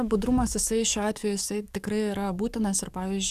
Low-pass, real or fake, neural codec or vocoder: 14.4 kHz; fake; codec, 44.1 kHz, 7.8 kbps, DAC